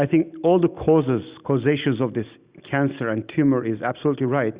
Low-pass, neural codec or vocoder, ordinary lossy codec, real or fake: 3.6 kHz; none; Opus, 64 kbps; real